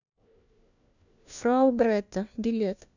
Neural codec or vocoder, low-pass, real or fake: codec, 16 kHz, 1 kbps, FunCodec, trained on LibriTTS, 50 frames a second; 7.2 kHz; fake